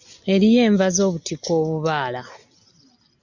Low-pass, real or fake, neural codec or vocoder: 7.2 kHz; real; none